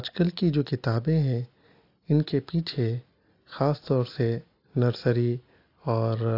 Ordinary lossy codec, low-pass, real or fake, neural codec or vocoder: AAC, 32 kbps; 5.4 kHz; real; none